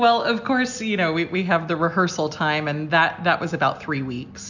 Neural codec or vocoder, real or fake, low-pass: none; real; 7.2 kHz